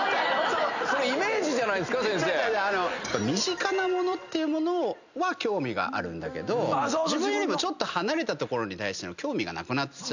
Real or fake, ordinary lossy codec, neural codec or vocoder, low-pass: real; none; none; 7.2 kHz